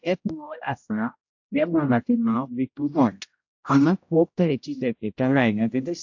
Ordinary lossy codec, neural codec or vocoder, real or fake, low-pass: AAC, 48 kbps; codec, 16 kHz, 0.5 kbps, X-Codec, HuBERT features, trained on general audio; fake; 7.2 kHz